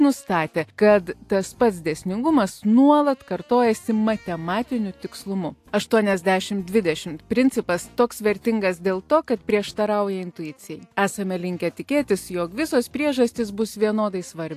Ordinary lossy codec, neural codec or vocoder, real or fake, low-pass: AAC, 64 kbps; none; real; 14.4 kHz